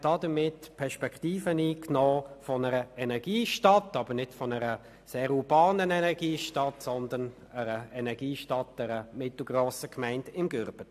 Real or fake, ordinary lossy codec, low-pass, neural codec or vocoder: real; AAC, 96 kbps; 14.4 kHz; none